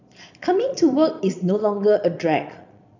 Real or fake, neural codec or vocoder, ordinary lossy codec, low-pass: fake; vocoder, 22.05 kHz, 80 mel bands, Vocos; none; 7.2 kHz